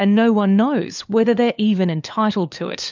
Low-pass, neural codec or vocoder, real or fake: 7.2 kHz; codec, 16 kHz, 4 kbps, FunCodec, trained on LibriTTS, 50 frames a second; fake